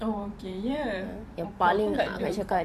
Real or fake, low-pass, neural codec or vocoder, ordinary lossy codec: fake; 14.4 kHz; vocoder, 44.1 kHz, 128 mel bands every 512 samples, BigVGAN v2; AAC, 96 kbps